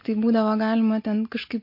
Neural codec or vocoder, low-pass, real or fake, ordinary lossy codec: none; 5.4 kHz; real; MP3, 32 kbps